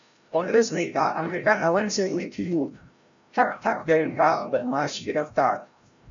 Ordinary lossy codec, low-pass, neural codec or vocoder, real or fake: AAC, 64 kbps; 7.2 kHz; codec, 16 kHz, 0.5 kbps, FreqCodec, larger model; fake